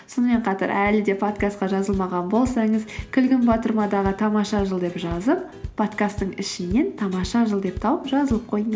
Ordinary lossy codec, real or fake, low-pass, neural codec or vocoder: none; real; none; none